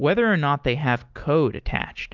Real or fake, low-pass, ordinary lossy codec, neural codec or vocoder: real; 7.2 kHz; Opus, 32 kbps; none